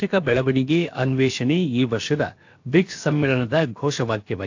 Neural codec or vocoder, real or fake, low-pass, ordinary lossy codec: codec, 16 kHz, about 1 kbps, DyCAST, with the encoder's durations; fake; 7.2 kHz; AAC, 48 kbps